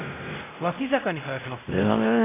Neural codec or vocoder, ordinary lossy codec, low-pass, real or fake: codec, 16 kHz, 0.5 kbps, X-Codec, WavLM features, trained on Multilingual LibriSpeech; AAC, 16 kbps; 3.6 kHz; fake